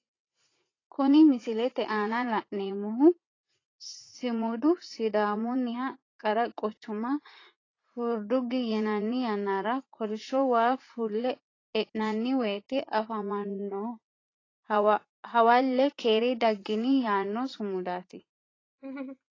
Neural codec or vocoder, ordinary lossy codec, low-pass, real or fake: vocoder, 44.1 kHz, 80 mel bands, Vocos; AAC, 32 kbps; 7.2 kHz; fake